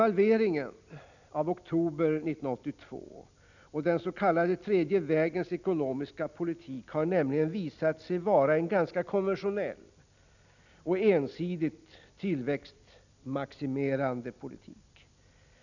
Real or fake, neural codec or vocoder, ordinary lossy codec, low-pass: real; none; none; 7.2 kHz